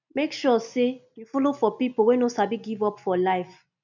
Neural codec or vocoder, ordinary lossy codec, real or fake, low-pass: none; none; real; 7.2 kHz